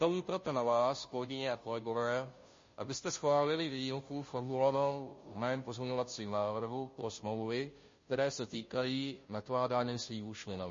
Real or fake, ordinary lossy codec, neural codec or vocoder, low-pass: fake; MP3, 32 kbps; codec, 16 kHz, 0.5 kbps, FunCodec, trained on Chinese and English, 25 frames a second; 7.2 kHz